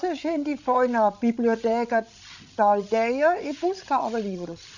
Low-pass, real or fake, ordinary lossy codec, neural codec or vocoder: 7.2 kHz; fake; none; codec, 16 kHz, 16 kbps, FreqCodec, larger model